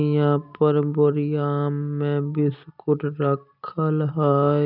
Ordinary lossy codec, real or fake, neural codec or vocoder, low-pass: none; real; none; 5.4 kHz